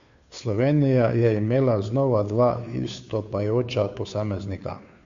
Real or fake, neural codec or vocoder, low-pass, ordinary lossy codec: fake; codec, 16 kHz, 2 kbps, FunCodec, trained on Chinese and English, 25 frames a second; 7.2 kHz; Opus, 64 kbps